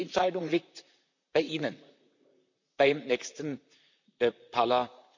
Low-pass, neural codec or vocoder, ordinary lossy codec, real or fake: 7.2 kHz; vocoder, 44.1 kHz, 128 mel bands, Pupu-Vocoder; none; fake